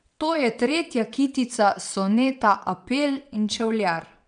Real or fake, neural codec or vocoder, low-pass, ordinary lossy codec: fake; vocoder, 22.05 kHz, 80 mel bands, WaveNeXt; 9.9 kHz; none